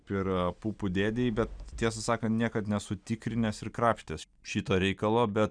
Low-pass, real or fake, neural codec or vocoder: 9.9 kHz; real; none